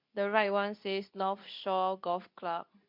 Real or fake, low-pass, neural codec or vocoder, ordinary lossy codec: fake; 5.4 kHz; codec, 24 kHz, 0.9 kbps, WavTokenizer, medium speech release version 2; MP3, 48 kbps